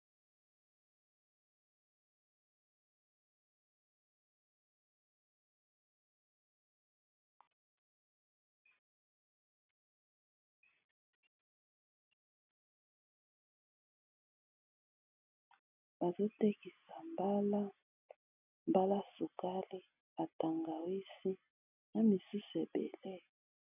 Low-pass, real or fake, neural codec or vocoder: 3.6 kHz; real; none